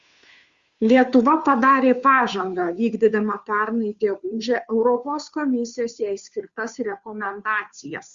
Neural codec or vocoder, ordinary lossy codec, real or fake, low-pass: codec, 16 kHz, 2 kbps, FunCodec, trained on Chinese and English, 25 frames a second; Opus, 64 kbps; fake; 7.2 kHz